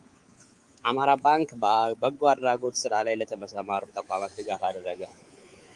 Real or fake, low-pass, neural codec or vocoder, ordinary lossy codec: fake; 10.8 kHz; codec, 24 kHz, 3.1 kbps, DualCodec; Opus, 24 kbps